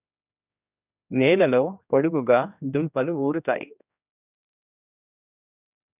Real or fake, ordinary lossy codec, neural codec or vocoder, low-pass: fake; none; codec, 16 kHz, 1 kbps, X-Codec, HuBERT features, trained on general audio; 3.6 kHz